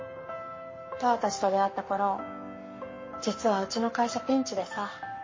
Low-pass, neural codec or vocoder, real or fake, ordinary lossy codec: 7.2 kHz; codec, 44.1 kHz, 7.8 kbps, Pupu-Codec; fake; MP3, 32 kbps